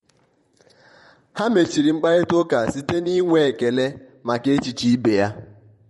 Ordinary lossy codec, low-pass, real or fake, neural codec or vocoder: MP3, 48 kbps; 19.8 kHz; fake; vocoder, 44.1 kHz, 128 mel bands, Pupu-Vocoder